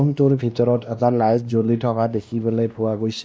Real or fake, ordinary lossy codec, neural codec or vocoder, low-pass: fake; none; codec, 16 kHz, 1 kbps, X-Codec, WavLM features, trained on Multilingual LibriSpeech; none